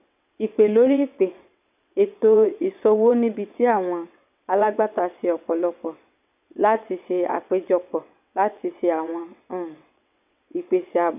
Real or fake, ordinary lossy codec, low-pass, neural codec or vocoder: fake; none; 3.6 kHz; vocoder, 22.05 kHz, 80 mel bands, WaveNeXt